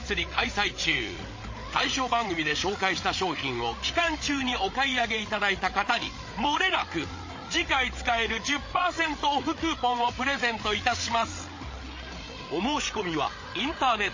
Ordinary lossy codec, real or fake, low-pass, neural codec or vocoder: MP3, 32 kbps; fake; 7.2 kHz; codec, 16 kHz, 8 kbps, FreqCodec, larger model